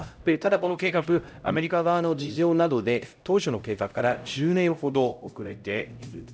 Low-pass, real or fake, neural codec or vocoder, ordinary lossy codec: none; fake; codec, 16 kHz, 0.5 kbps, X-Codec, HuBERT features, trained on LibriSpeech; none